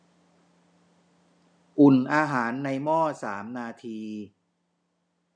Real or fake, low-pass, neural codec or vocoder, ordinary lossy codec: real; 9.9 kHz; none; MP3, 96 kbps